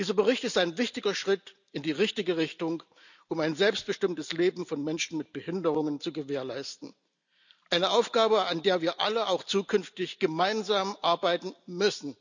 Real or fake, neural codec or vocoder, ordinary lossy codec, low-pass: real; none; none; 7.2 kHz